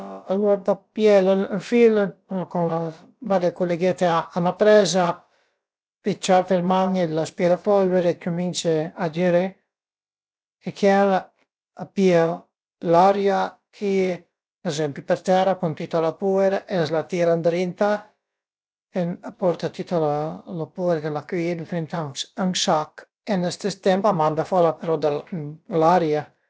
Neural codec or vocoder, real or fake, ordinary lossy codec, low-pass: codec, 16 kHz, about 1 kbps, DyCAST, with the encoder's durations; fake; none; none